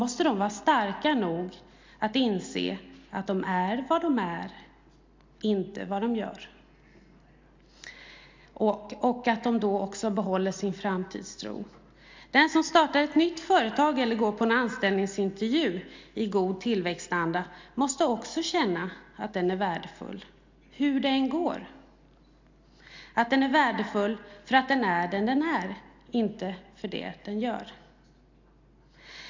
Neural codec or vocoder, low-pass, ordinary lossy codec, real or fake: none; 7.2 kHz; MP3, 64 kbps; real